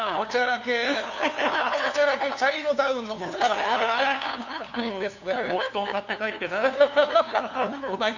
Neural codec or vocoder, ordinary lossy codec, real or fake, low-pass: codec, 16 kHz, 2 kbps, FunCodec, trained on LibriTTS, 25 frames a second; none; fake; 7.2 kHz